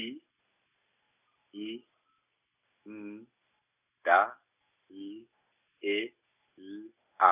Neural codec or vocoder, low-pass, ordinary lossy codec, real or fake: none; 3.6 kHz; none; real